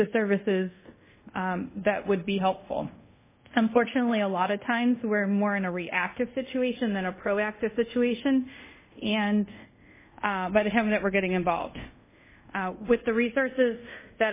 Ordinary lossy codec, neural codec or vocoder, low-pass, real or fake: MP3, 16 kbps; codec, 24 kHz, 0.5 kbps, DualCodec; 3.6 kHz; fake